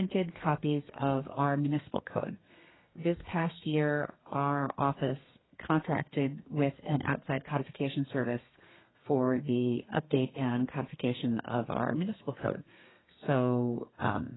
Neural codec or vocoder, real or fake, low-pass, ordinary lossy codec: codec, 32 kHz, 1.9 kbps, SNAC; fake; 7.2 kHz; AAC, 16 kbps